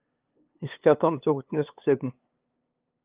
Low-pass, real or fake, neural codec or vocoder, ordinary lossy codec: 3.6 kHz; fake; codec, 16 kHz, 2 kbps, FunCodec, trained on LibriTTS, 25 frames a second; Opus, 24 kbps